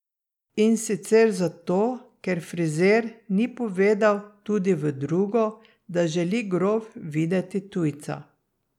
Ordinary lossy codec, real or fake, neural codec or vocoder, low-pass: none; real; none; 19.8 kHz